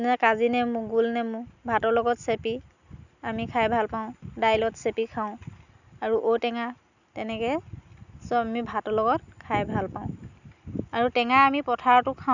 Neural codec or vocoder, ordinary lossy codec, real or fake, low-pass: none; none; real; 7.2 kHz